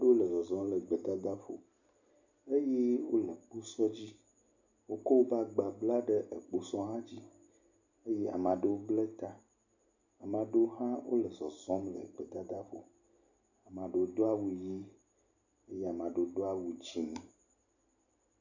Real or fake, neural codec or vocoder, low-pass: real; none; 7.2 kHz